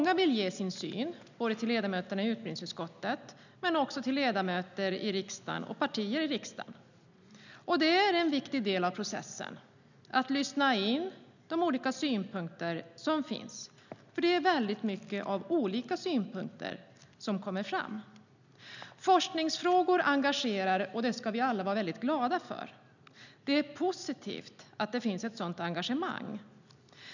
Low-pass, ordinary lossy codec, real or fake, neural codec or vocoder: 7.2 kHz; none; real; none